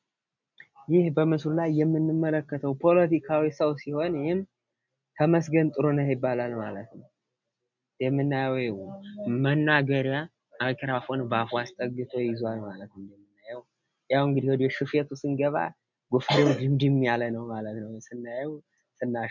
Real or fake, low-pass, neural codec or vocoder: real; 7.2 kHz; none